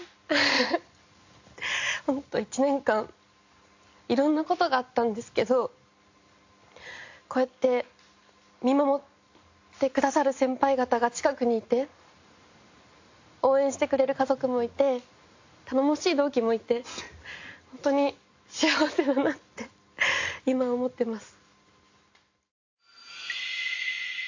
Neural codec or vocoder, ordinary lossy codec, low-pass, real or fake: none; AAC, 48 kbps; 7.2 kHz; real